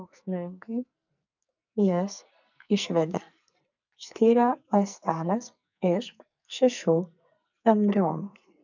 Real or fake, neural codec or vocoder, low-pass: fake; codec, 44.1 kHz, 2.6 kbps, SNAC; 7.2 kHz